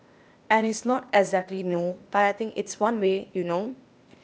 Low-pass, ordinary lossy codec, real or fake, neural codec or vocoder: none; none; fake; codec, 16 kHz, 0.8 kbps, ZipCodec